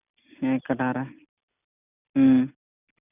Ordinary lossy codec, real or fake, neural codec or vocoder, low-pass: none; real; none; 3.6 kHz